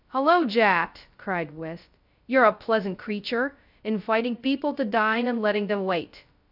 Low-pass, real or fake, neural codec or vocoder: 5.4 kHz; fake; codec, 16 kHz, 0.2 kbps, FocalCodec